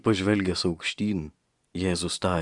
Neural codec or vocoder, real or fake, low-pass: vocoder, 24 kHz, 100 mel bands, Vocos; fake; 10.8 kHz